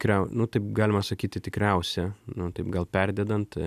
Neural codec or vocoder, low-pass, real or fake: none; 14.4 kHz; real